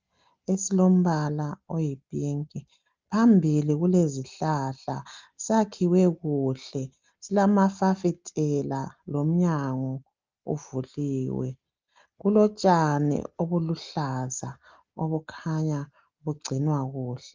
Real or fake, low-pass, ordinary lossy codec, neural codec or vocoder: real; 7.2 kHz; Opus, 24 kbps; none